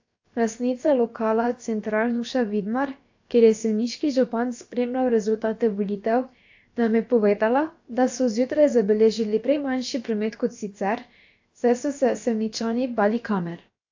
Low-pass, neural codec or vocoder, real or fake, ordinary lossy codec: 7.2 kHz; codec, 16 kHz, about 1 kbps, DyCAST, with the encoder's durations; fake; AAC, 48 kbps